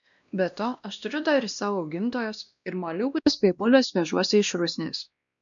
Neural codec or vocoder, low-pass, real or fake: codec, 16 kHz, 1 kbps, X-Codec, WavLM features, trained on Multilingual LibriSpeech; 7.2 kHz; fake